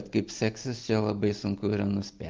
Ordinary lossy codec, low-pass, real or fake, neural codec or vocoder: Opus, 24 kbps; 7.2 kHz; real; none